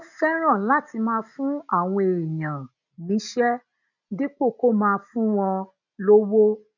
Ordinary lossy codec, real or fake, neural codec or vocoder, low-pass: none; real; none; 7.2 kHz